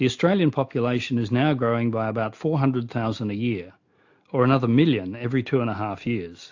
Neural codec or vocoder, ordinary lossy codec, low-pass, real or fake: none; AAC, 48 kbps; 7.2 kHz; real